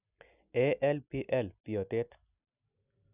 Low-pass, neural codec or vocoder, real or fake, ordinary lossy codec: 3.6 kHz; none; real; none